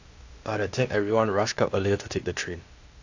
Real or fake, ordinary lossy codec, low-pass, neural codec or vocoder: fake; AAC, 48 kbps; 7.2 kHz; codec, 16 kHz, 0.8 kbps, ZipCodec